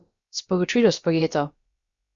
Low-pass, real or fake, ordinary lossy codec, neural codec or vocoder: 7.2 kHz; fake; Opus, 64 kbps; codec, 16 kHz, about 1 kbps, DyCAST, with the encoder's durations